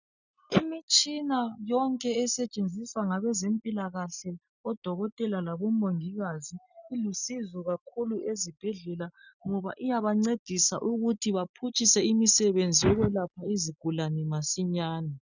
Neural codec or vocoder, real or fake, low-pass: none; real; 7.2 kHz